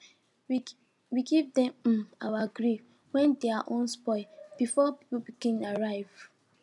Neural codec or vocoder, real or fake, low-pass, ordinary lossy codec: none; real; 10.8 kHz; none